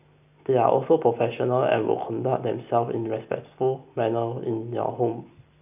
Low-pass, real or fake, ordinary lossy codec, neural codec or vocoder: 3.6 kHz; real; none; none